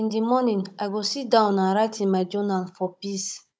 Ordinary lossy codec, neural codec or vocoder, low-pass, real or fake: none; codec, 16 kHz, 16 kbps, FunCodec, trained on Chinese and English, 50 frames a second; none; fake